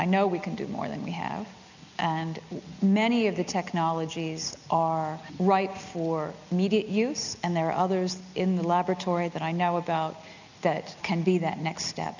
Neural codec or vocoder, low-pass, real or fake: none; 7.2 kHz; real